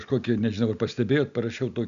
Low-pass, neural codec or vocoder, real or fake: 7.2 kHz; none; real